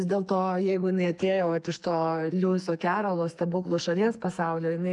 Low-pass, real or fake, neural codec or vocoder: 10.8 kHz; fake; codec, 44.1 kHz, 2.6 kbps, SNAC